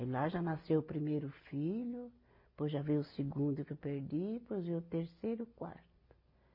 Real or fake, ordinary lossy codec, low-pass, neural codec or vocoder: real; MP3, 32 kbps; 5.4 kHz; none